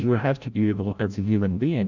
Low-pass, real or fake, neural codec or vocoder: 7.2 kHz; fake; codec, 16 kHz, 0.5 kbps, FreqCodec, larger model